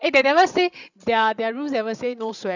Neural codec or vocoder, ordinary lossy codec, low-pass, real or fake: codec, 16 kHz, 16 kbps, FreqCodec, larger model; none; 7.2 kHz; fake